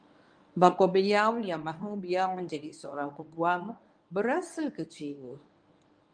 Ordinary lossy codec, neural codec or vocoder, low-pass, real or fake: Opus, 32 kbps; codec, 24 kHz, 0.9 kbps, WavTokenizer, medium speech release version 1; 9.9 kHz; fake